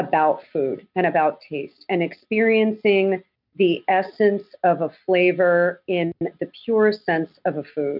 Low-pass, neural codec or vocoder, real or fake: 5.4 kHz; none; real